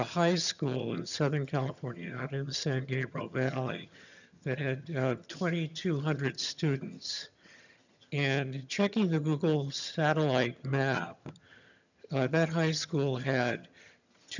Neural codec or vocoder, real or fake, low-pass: vocoder, 22.05 kHz, 80 mel bands, HiFi-GAN; fake; 7.2 kHz